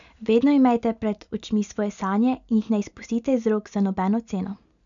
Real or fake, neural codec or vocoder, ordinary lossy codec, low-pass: real; none; none; 7.2 kHz